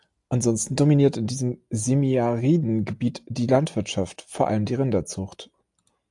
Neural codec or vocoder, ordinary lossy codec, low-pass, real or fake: none; AAC, 64 kbps; 10.8 kHz; real